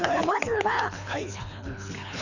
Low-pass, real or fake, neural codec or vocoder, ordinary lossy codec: 7.2 kHz; fake; codec, 24 kHz, 3 kbps, HILCodec; none